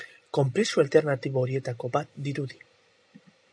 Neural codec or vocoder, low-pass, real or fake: none; 9.9 kHz; real